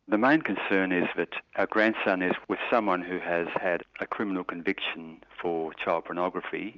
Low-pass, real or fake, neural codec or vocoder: 7.2 kHz; real; none